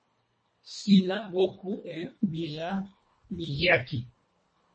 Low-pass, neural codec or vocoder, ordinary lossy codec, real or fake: 9.9 kHz; codec, 24 kHz, 1.5 kbps, HILCodec; MP3, 32 kbps; fake